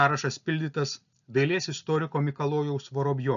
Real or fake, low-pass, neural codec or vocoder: real; 7.2 kHz; none